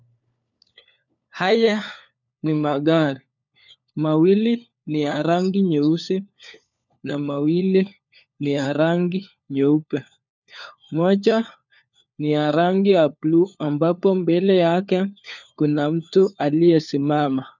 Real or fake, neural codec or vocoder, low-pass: fake; codec, 16 kHz, 4 kbps, FunCodec, trained on LibriTTS, 50 frames a second; 7.2 kHz